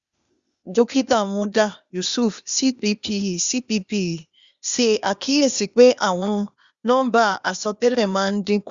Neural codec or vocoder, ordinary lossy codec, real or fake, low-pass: codec, 16 kHz, 0.8 kbps, ZipCodec; Opus, 64 kbps; fake; 7.2 kHz